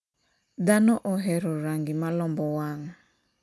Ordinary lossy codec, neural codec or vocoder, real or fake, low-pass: none; none; real; none